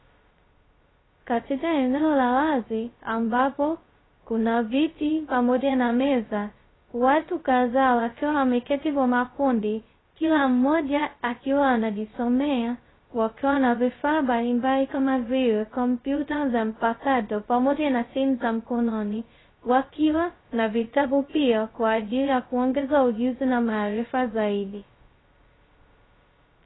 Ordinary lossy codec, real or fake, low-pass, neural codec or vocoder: AAC, 16 kbps; fake; 7.2 kHz; codec, 16 kHz, 0.2 kbps, FocalCodec